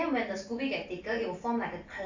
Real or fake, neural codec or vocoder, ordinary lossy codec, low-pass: real; none; AAC, 48 kbps; 7.2 kHz